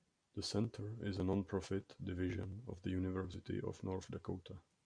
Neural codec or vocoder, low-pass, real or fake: none; 9.9 kHz; real